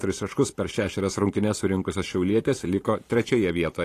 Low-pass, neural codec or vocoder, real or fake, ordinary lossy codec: 14.4 kHz; vocoder, 44.1 kHz, 128 mel bands every 512 samples, BigVGAN v2; fake; AAC, 48 kbps